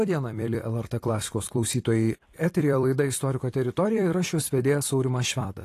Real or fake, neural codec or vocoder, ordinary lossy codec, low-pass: fake; vocoder, 44.1 kHz, 128 mel bands every 256 samples, BigVGAN v2; AAC, 48 kbps; 14.4 kHz